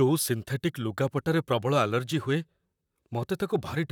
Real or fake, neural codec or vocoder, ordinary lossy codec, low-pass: fake; vocoder, 44.1 kHz, 128 mel bands, Pupu-Vocoder; none; 19.8 kHz